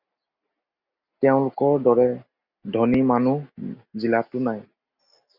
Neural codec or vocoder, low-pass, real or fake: none; 5.4 kHz; real